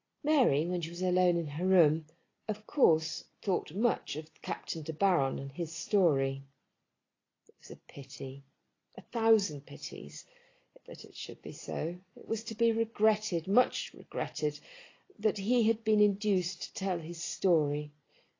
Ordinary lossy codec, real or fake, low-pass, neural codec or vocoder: AAC, 32 kbps; real; 7.2 kHz; none